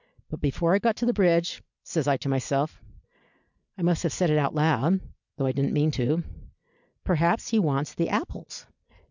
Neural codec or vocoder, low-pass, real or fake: none; 7.2 kHz; real